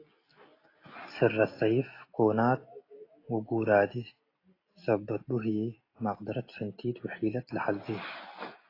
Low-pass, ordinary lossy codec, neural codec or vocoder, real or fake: 5.4 kHz; AAC, 24 kbps; none; real